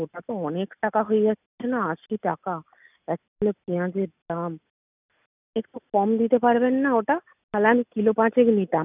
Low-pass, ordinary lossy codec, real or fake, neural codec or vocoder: 3.6 kHz; none; real; none